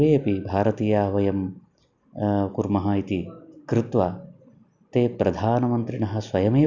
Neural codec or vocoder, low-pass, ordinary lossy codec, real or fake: none; 7.2 kHz; none; real